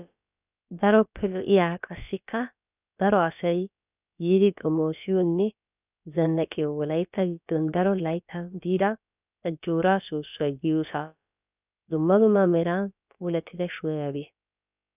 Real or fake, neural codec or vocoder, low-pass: fake; codec, 16 kHz, about 1 kbps, DyCAST, with the encoder's durations; 3.6 kHz